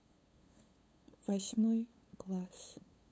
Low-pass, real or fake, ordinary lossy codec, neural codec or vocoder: none; fake; none; codec, 16 kHz, 8 kbps, FunCodec, trained on LibriTTS, 25 frames a second